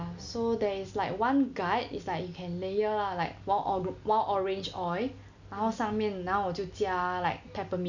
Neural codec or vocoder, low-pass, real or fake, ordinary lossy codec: none; 7.2 kHz; real; none